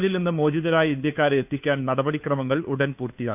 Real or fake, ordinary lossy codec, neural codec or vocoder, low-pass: fake; none; codec, 16 kHz, 2 kbps, FunCodec, trained on Chinese and English, 25 frames a second; 3.6 kHz